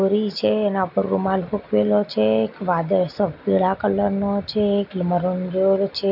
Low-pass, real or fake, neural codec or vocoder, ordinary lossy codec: 5.4 kHz; real; none; none